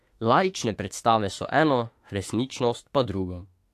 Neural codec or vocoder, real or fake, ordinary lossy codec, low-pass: autoencoder, 48 kHz, 32 numbers a frame, DAC-VAE, trained on Japanese speech; fake; AAC, 64 kbps; 14.4 kHz